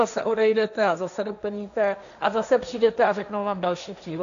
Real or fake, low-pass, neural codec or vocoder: fake; 7.2 kHz; codec, 16 kHz, 1.1 kbps, Voila-Tokenizer